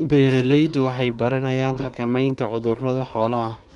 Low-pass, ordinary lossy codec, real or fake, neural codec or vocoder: 10.8 kHz; none; fake; codec, 24 kHz, 1 kbps, SNAC